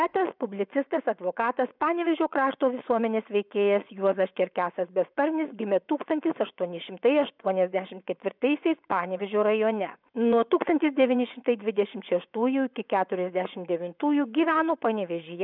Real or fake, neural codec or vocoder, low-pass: fake; vocoder, 24 kHz, 100 mel bands, Vocos; 5.4 kHz